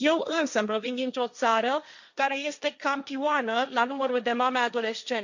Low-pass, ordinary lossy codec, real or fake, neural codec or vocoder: 7.2 kHz; none; fake; codec, 16 kHz, 1.1 kbps, Voila-Tokenizer